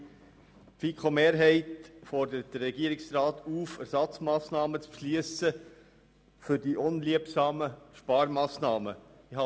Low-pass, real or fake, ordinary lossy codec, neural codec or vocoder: none; real; none; none